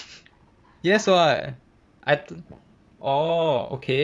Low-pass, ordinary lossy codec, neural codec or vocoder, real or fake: none; none; vocoder, 22.05 kHz, 80 mel bands, Vocos; fake